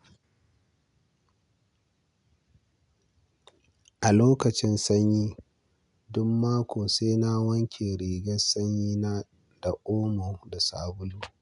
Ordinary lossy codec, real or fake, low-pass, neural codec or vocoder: none; real; 10.8 kHz; none